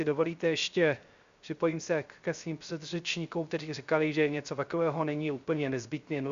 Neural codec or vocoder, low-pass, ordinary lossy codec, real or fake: codec, 16 kHz, 0.3 kbps, FocalCodec; 7.2 kHz; Opus, 64 kbps; fake